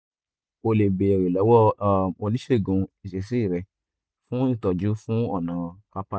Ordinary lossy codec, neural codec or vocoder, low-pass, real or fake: none; none; none; real